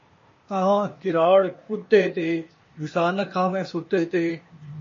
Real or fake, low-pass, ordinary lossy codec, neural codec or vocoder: fake; 7.2 kHz; MP3, 32 kbps; codec, 16 kHz, 0.8 kbps, ZipCodec